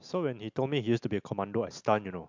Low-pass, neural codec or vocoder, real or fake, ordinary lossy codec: 7.2 kHz; none; real; MP3, 64 kbps